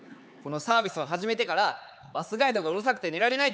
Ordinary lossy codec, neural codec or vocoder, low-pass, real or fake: none; codec, 16 kHz, 4 kbps, X-Codec, HuBERT features, trained on LibriSpeech; none; fake